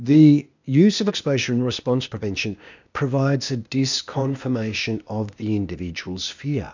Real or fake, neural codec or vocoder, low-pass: fake; codec, 16 kHz, 0.8 kbps, ZipCodec; 7.2 kHz